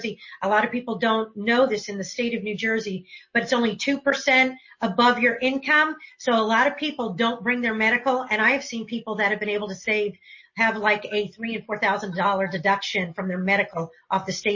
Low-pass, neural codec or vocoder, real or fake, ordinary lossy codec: 7.2 kHz; none; real; MP3, 32 kbps